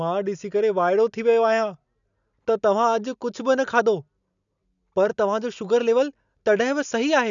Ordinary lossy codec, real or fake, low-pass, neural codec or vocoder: none; real; 7.2 kHz; none